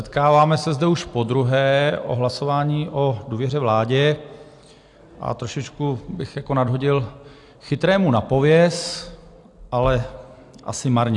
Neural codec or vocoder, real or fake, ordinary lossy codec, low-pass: none; real; AAC, 64 kbps; 10.8 kHz